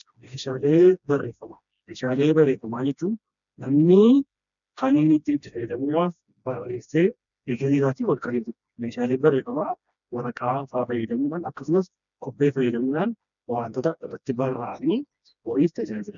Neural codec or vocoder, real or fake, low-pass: codec, 16 kHz, 1 kbps, FreqCodec, smaller model; fake; 7.2 kHz